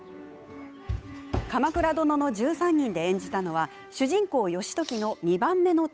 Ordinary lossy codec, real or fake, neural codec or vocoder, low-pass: none; fake; codec, 16 kHz, 8 kbps, FunCodec, trained on Chinese and English, 25 frames a second; none